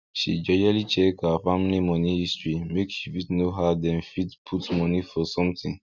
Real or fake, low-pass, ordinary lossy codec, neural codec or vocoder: real; 7.2 kHz; none; none